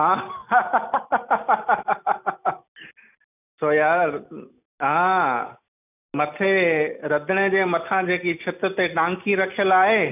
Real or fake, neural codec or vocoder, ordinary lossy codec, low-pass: real; none; none; 3.6 kHz